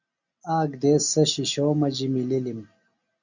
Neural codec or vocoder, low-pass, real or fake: none; 7.2 kHz; real